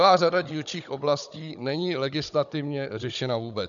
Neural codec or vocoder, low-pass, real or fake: codec, 16 kHz, 16 kbps, FunCodec, trained on Chinese and English, 50 frames a second; 7.2 kHz; fake